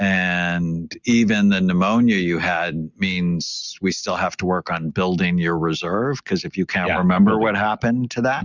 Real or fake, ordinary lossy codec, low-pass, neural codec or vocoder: real; Opus, 64 kbps; 7.2 kHz; none